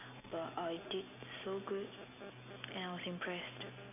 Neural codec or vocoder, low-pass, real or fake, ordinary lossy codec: none; 3.6 kHz; real; none